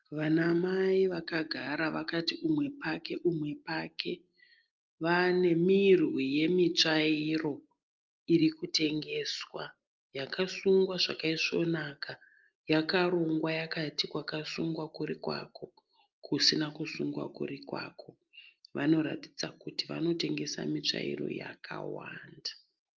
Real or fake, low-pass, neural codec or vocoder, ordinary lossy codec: real; 7.2 kHz; none; Opus, 24 kbps